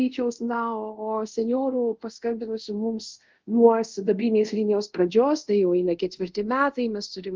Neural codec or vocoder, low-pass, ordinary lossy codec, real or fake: codec, 24 kHz, 0.5 kbps, DualCodec; 7.2 kHz; Opus, 24 kbps; fake